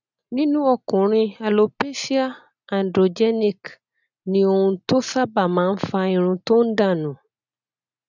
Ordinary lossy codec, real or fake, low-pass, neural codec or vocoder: none; real; 7.2 kHz; none